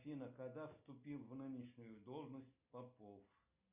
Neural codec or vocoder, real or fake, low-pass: none; real; 3.6 kHz